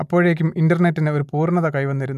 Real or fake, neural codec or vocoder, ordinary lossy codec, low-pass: real; none; none; 14.4 kHz